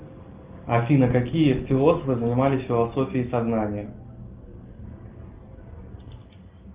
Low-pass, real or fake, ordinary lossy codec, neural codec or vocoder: 3.6 kHz; real; Opus, 32 kbps; none